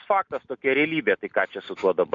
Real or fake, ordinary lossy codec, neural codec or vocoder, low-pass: real; MP3, 64 kbps; none; 7.2 kHz